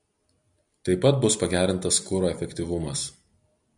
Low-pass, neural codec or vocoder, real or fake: 10.8 kHz; none; real